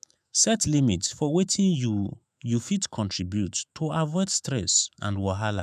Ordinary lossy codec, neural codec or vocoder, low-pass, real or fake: none; autoencoder, 48 kHz, 128 numbers a frame, DAC-VAE, trained on Japanese speech; 14.4 kHz; fake